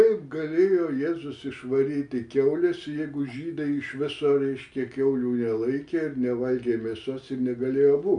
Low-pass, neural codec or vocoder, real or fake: 9.9 kHz; none; real